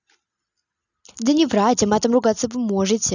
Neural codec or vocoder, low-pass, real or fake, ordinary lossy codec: none; 7.2 kHz; real; none